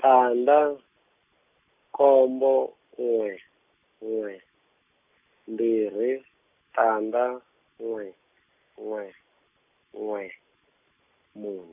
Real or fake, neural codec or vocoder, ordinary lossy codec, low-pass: real; none; none; 3.6 kHz